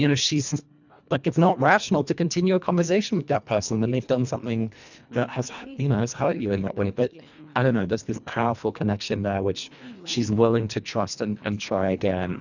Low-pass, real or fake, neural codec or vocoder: 7.2 kHz; fake; codec, 24 kHz, 1.5 kbps, HILCodec